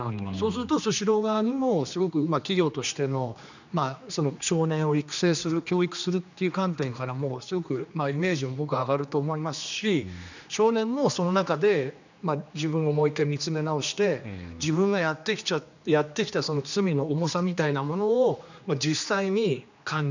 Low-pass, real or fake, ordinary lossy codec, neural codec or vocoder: 7.2 kHz; fake; none; codec, 16 kHz, 2 kbps, X-Codec, HuBERT features, trained on general audio